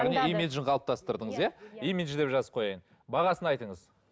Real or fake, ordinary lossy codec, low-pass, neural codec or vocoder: real; none; none; none